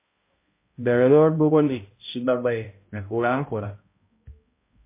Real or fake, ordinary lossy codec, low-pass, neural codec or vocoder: fake; MP3, 24 kbps; 3.6 kHz; codec, 16 kHz, 0.5 kbps, X-Codec, HuBERT features, trained on balanced general audio